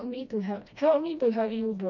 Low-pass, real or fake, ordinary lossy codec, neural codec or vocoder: 7.2 kHz; fake; none; codec, 16 kHz, 1 kbps, FreqCodec, smaller model